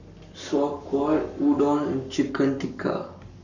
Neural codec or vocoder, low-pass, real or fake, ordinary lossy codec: codec, 44.1 kHz, 7.8 kbps, Pupu-Codec; 7.2 kHz; fake; none